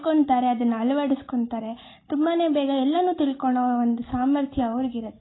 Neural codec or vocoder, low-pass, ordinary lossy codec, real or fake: none; 7.2 kHz; AAC, 16 kbps; real